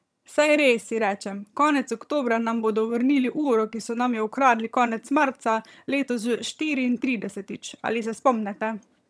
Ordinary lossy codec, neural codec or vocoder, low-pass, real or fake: none; vocoder, 22.05 kHz, 80 mel bands, HiFi-GAN; none; fake